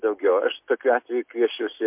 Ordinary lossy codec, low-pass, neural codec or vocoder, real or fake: MP3, 32 kbps; 3.6 kHz; none; real